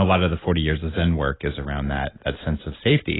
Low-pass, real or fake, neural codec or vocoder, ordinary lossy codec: 7.2 kHz; real; none; AAC, 16 kbps